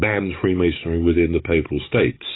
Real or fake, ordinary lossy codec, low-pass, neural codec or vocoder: fake; AAC, 16 kbps; 7.2 kHz; codec, 44.1 kHz, 7.8 kbps, DAC